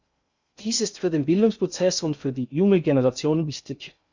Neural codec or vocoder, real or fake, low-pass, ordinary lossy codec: codec, 16 kHz in and 24 kHz out, 0.6 kbps, FocalCodec, streaming, 2048 codes; fake; 7.2 kHz; Opus, 64 kbps